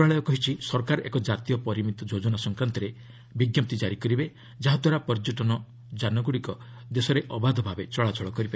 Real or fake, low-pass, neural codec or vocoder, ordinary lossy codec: real; none; none; none